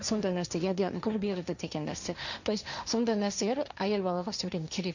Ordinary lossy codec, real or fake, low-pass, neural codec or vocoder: none; fake; 7.2 kHz; codec, 16 kHz, 1.1 kbps, Voila-Tokenizer